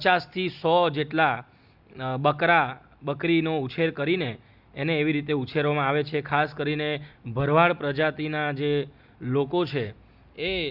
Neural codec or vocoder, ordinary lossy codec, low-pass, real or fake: none; Opus, 64 kbps; 5.4 kHz; real